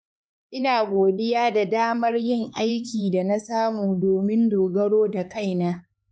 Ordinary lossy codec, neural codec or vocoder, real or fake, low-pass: none; codec, 16 kHz, 4 kbps, X-Codec, HuBERT features, trained on LibriSpeech; fake; none